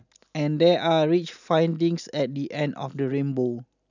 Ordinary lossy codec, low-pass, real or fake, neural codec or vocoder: none; 7.2 kHz; real; none